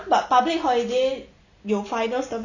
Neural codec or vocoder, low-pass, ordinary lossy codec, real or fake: none; 7.2 kHz; none; real